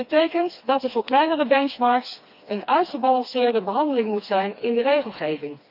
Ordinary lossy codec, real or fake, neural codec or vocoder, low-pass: none; fake; codec, 16 kHz, 2 kbps, FreqCodec, smaller model; 5.4 kHz